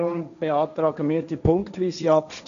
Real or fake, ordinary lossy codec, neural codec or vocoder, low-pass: fake; none; codec, 16 kHz, 1.1 kbps, Voila-Tokenizer; 7.2 kHz